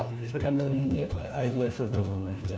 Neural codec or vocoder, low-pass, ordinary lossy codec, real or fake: codec, 16 kHz, 1 kbps, FunCodec, trained on LibriTTS, 50 frames a second; none; none; fake